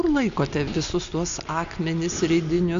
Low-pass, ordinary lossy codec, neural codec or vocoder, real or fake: 7.2 kHz; MP3, 64 kbps; none; real